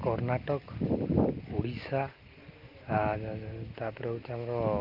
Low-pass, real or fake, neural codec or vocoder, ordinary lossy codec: 5.4 kHz; real; none; Opus, 32 kbps